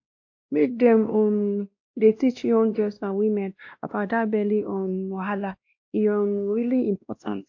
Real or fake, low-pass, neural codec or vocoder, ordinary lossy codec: fake; 7.2 kHz; codec, 16 kHz, 1 kbps, X-Codec, WavLM features, trained on Multilingual LibriSpeech; AAC, 48 kbps